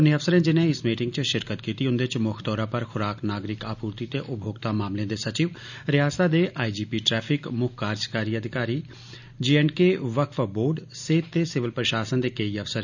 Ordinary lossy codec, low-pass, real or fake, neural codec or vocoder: none; 7.2 kHz; real; none